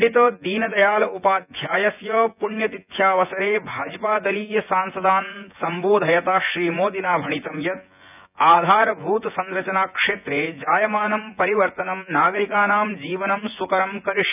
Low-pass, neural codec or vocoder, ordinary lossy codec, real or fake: 3.6 kHz; vocoder, 24 kHz, 100 mel bands, Vocos; none; fake